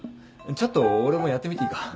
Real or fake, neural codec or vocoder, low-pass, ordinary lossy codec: real; none; none; none